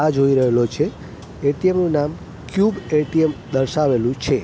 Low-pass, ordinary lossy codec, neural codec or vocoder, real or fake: none; none; none; real